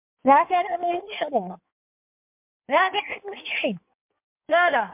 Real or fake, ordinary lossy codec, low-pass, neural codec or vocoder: fake; MP3, 32 kbps; 3.6 kHz; codec, 16 kHz in and 24 kHz out, 1.1 kbps, FireRedTTS-2 codec